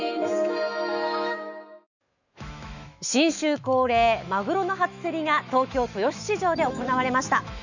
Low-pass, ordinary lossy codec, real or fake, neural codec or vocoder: 7.2 kHz; none; fake; autoencoder, 48 kHz, 128 numbers a frame, DAC-VAE, trained on Japanese speech